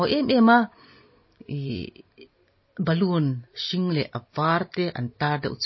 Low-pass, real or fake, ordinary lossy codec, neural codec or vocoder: 7.2 kHz; real; MP3, 24 kbps; none